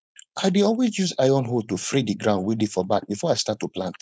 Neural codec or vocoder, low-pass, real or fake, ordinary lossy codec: codec, 16 kHz, 4.8 kbps, FACodec; none; fake; none